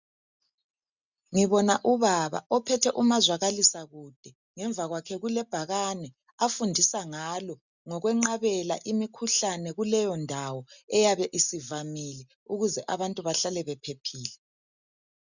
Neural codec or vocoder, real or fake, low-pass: none; real; 7.2 kHz